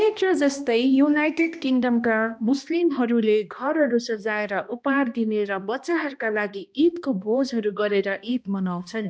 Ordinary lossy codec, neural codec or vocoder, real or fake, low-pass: none; codec, 16 kHz, 1 kbps, X-Codec, HuBERT features, trained on balanced general audio; fake; none